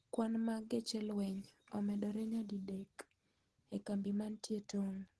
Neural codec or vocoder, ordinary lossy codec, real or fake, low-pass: none; Opus, 16 kbps; real; 10.8 kHz